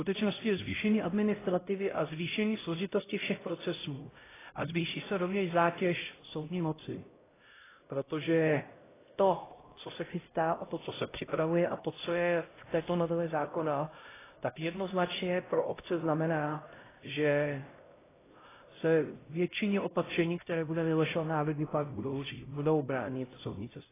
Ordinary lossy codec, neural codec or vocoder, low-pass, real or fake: AAC, 16 kbps; codec, 16 kHz, 0.5 kbps, X-Codec, HuBERT features, trained on LibriSpeech; 3.6 kHz; fake